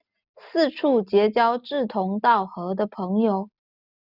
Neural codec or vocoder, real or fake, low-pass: none; real; 5.4 kHz